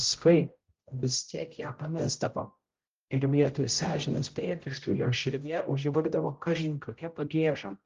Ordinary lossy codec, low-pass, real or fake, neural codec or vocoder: Opus, 32 kbps; 7.2 kHz; fake; codec, 16 kHz, 0.5 kbps, X-Codec, HuBERT features, trained on general audio